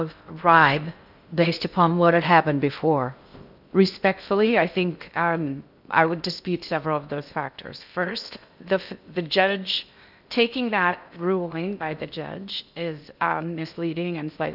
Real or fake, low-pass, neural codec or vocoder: fake; 5.4 kHz; codec, 16 kHz in and 24 kHz out, 0.6 kbps, FocalCodec, streaming, 2048 codes